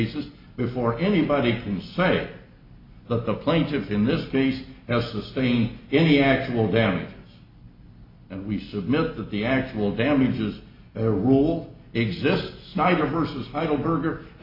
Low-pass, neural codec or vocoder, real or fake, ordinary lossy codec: 5.4 kHz; none; real; MP3, 32 kbps